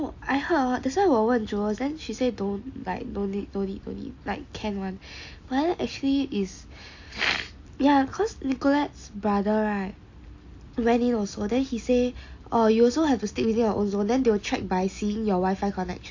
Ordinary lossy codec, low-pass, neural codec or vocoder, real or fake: AAC, 48 kbps; 7.2 kHz; none; real